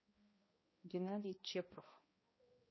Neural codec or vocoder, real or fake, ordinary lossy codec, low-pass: codec, 16 kHz, 1 kbps, X-Codec, HuBERT features, trained on balanced general audio; fake; MP3, 24 kbps; 7.2 kHz